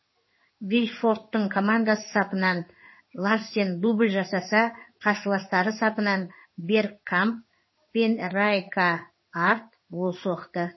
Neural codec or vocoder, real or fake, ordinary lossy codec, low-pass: codec, 16 kHz in and 24 kHz out, 1 kbps, XY-Tokenizer; fake; MP3, 24 kbps; 7.2 kHz